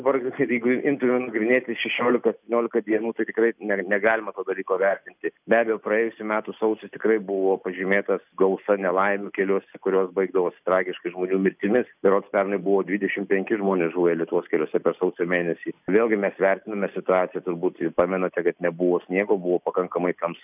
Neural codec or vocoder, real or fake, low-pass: none; real; 3.6 kHz